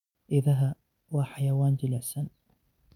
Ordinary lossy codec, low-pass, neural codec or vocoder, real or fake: none; 19.8 kHz; none; real